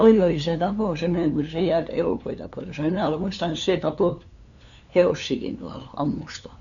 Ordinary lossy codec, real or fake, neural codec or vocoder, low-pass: none; fake; codec, 16 kHz, 2 kbps, FunCodec, trained on LibriTTS, 25 frames a second; 7.2 kHz